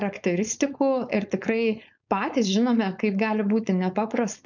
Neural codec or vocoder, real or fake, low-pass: codec, 16 kHz, 4.8 kbps, FACodec; fake; 7.2 kHz